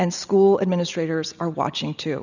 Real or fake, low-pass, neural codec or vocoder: real; 7.2 kHz; none